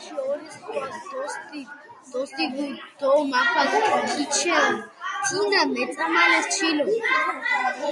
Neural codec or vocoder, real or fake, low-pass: none; real; 10.8 kHz